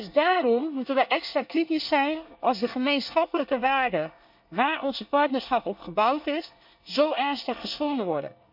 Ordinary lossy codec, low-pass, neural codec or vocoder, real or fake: none; 5.4 kHz; codec, 24 kHz, 1 kbps, SNAC; fake